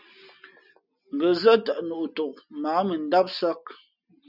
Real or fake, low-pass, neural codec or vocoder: real; 5.4 kHz; none